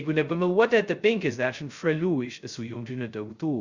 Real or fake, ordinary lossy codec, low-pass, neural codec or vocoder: fake; Opus, 64 kbps; 7.2 kHz; codec, 16 kHz, 0.2 kbps, FocalCodec